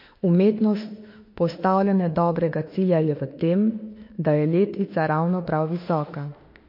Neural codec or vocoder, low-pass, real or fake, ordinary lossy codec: autoencoder, 48 kHz, 32 numbers a frame, DAC-VAE, trained on Japanese speech; 5.4 kHz; fake; MP3, 32 kbps